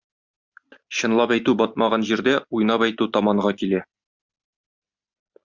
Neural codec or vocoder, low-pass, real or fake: none; 7.2 kHz; real